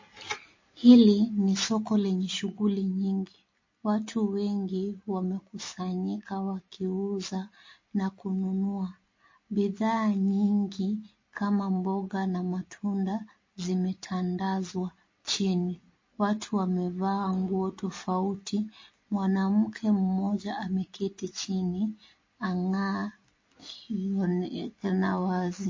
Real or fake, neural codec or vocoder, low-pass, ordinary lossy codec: real; none; 7.2 kHz; MP3, 32 kbps